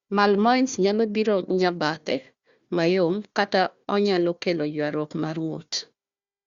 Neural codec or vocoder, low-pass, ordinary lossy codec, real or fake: codec, 16 kHz, 1 kbps, FunCodec, trained on Chinese and English, 50 frames a second; 7.2 kHz; Opus, 64 kbps; fake